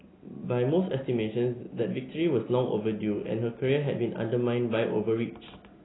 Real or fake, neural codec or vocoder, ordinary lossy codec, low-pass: real; none; AAC, 16 kbps; 7.2 kHz